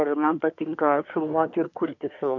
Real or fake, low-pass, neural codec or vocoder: fake; 7.2 kHz; codec, 24 kHz, 1 kbps, SNAC